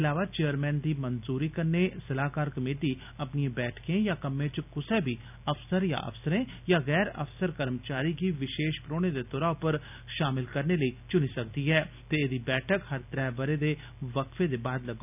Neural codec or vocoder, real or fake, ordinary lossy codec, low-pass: none; real; none; 3.6 kHz